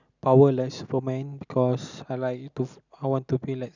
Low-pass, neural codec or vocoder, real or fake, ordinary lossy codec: 7.2 kHz; none; real; none